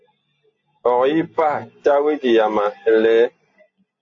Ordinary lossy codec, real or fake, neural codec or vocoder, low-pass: AAC, 48 kbps; real; none; 7.2 kHz